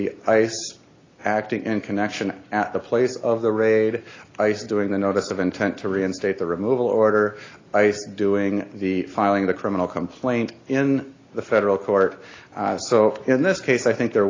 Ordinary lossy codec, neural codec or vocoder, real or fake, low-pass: AAC, 32 kbps; none; real; 7.2 kHz